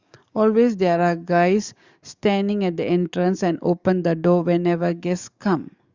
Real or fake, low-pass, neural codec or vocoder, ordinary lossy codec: fake; 7.2 kHz; codec, 44.1 kHz, 7.8 kbps, DAC; Opus, 64 kbps